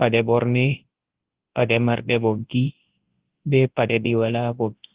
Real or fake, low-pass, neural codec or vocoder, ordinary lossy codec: fake; 3.6 kHz; codec, 24 kHz, 0.9 kbps, WavTokenizer, large speech release; Opus, 16 kbps